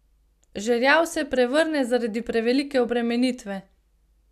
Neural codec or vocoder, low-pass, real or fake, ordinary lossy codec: none; 14.4 kHz; real; none